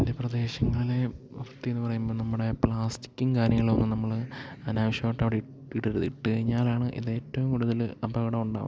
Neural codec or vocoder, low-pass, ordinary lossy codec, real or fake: none; none; none; real